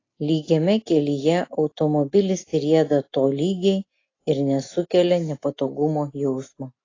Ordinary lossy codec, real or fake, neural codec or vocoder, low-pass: AAC, 32 kbps; real; none; 7.2 kHz